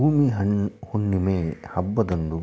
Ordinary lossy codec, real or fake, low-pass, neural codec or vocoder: none; real; none; none